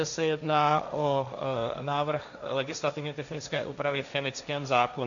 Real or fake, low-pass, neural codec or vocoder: fake; 7.2 kHz; codec, 16 kHz, 1.1 kbps, Voila-Tokenizer